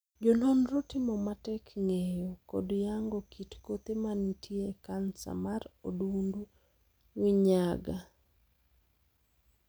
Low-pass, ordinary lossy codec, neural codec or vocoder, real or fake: none; none; none; real